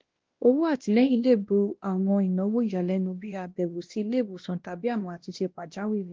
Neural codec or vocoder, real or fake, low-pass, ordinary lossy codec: codec, 16 kHz, 1 kbps, X-Codec, WavLM features, trained on Multilingual LibriSpeech; fake; 7.2 kHz; Opus, 16 kbps